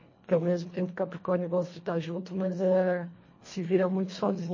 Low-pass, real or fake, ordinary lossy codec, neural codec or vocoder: 7.2 kHz; fake; MP3, 32 kbps; codec, 24 kHz, 1.5 kbps, HILCodec